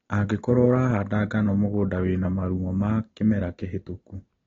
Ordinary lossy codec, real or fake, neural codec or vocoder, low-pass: AAC, 24 kbps; real; none; 7.2 kHz